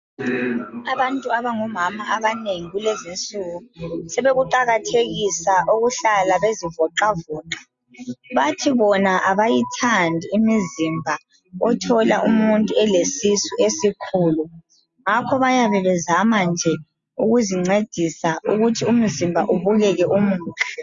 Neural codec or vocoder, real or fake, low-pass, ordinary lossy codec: none; real; 7.2 kHz; Opus, 64 kbps